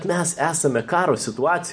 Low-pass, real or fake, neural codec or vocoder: 9.9 kHz; real; none